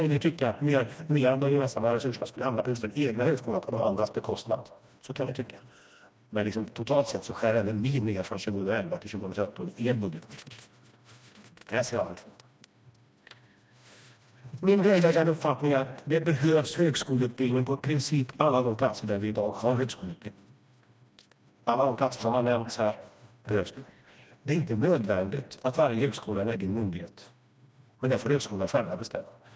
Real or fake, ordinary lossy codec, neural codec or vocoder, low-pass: fake; none; codec, 16 kHz, 1 kbps, FreqCodec, smaller model; none